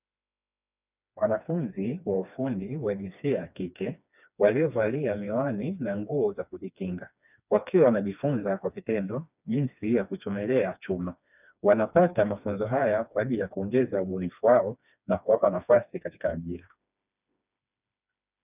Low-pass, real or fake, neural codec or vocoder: 3.6 kHz; fake; codec, 16 kHz, 2 kbps, FreqCodec, smaller model